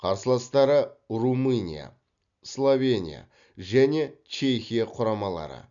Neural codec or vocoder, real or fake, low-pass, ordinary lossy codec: none; real; 7.2 kHz; MP3, 96 kbps